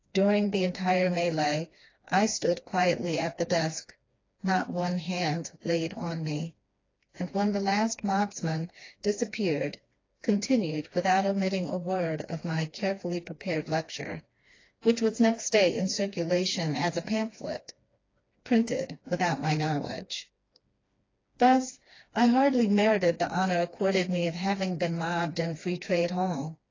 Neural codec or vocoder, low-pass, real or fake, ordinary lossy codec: codec, 16 kHz, 2 kbps, FreqCodec, smaller model; 7.2 kHz; fake; AAC, 32 kbps